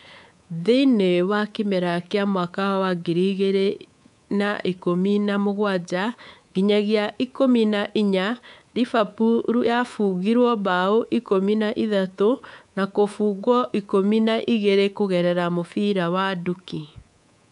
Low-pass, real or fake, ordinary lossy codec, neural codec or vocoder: 10.8 kHz; fake; none; codec, 24 kHz, 3.1 kbps, DualCodec